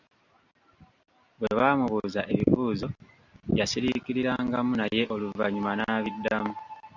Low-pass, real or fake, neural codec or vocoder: 7.2 kHz; real; none